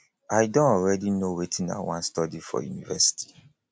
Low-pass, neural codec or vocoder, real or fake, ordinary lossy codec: none; none; real; none